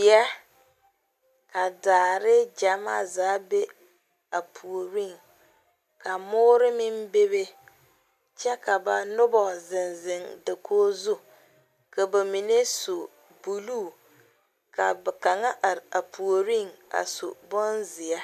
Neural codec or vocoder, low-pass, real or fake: none; 14.4 kHz; real